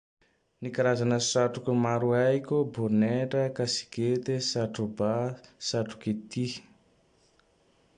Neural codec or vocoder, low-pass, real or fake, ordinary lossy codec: none; 9.9 kHz; real; AAC, 64 kbps